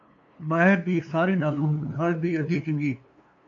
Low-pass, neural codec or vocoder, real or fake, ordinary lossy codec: 7.2 kHz; codec, 16 kHz, 2 kbps, FunCodec, trained on LibriTTS, 25 frames a second; fake; AAC, 64 kbps